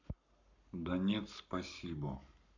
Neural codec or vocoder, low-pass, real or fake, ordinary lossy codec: codec, 44.1 kHz, 7.8 kbps, Pupu-Codec; 7.2 kHz; fake; none